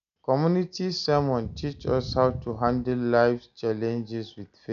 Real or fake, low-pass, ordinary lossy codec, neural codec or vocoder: real; 7.2 kHz; none; none